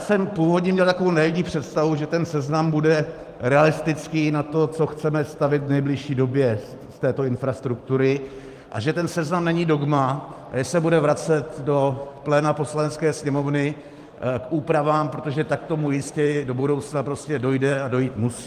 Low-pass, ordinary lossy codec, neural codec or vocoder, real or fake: 14.4 kHz; Opus, 32 kbps; vocoder, 44.1 kHz, 128 mel bands every 512 samples, BigVGAN v2; fake